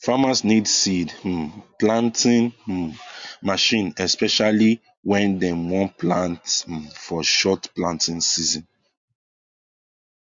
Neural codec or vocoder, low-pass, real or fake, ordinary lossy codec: none; 7.2 kHz; real; MP3, 48 kbps